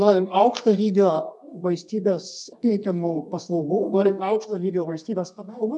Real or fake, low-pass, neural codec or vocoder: fake; 10.8 kHz; codec, 24 kHz, 0.9 kbps, WavTokenizer, medium music audio release